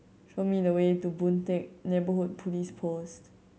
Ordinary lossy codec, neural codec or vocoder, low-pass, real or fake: none; none; none; real